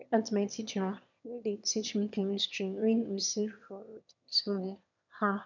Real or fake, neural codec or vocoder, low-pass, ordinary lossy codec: fake; autoencoder, 22.05 kHz, a latent of 192 numbers a frame, VITS, trained on one speaker; 7.2 kHz; none